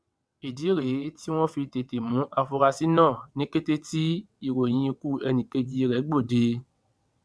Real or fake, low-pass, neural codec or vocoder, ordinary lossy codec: fake; none; vocoder, 22.05 kHz, 80 mel bands, WaveNeXt; none